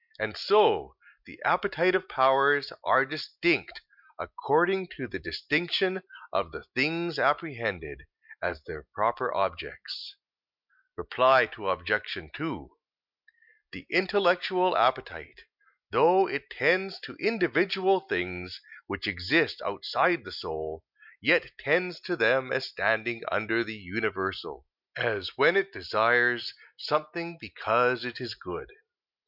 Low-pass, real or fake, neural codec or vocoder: 5.4 kHz; real; none